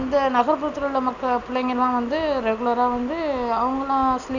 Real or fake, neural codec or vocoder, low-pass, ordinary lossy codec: real; none; 7.2 kHz; none